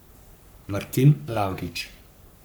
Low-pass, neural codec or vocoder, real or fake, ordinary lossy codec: none; codec, 44.1 kHz, 3.4 kbps, Pupu-Codec; fake; none